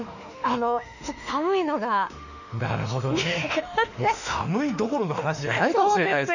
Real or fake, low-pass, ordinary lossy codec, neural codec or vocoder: fake; 7.2 kHz; none; autoencoder, 48 kHz, 32 numbers a frame, DAC-VAE, trained on Japanese speech